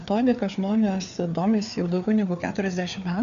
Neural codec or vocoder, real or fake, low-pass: codec, 16 kHz, 4 kbps, FreqCodec, larger model; fake; 7.2 kHz